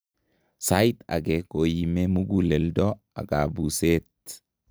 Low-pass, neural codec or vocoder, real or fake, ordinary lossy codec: none; none; real; none